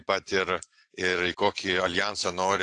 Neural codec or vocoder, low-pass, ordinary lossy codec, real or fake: vocoder, 44.1 kHz, 128 mel bands every 512 samples, BigVGAN v2; 10.8 kHz; AAC, 48 kbps; fake